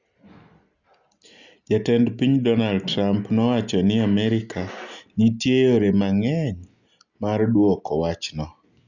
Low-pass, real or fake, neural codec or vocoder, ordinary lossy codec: 7.2 kHz; real; none; Opus, 64 kbps